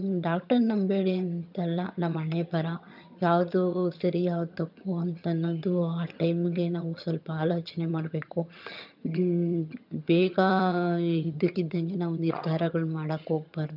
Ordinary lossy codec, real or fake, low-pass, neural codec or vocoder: none; fake; 5.4 kHz; vocoder, 22.05 kHz, 80 mel bands, HiFi-GAN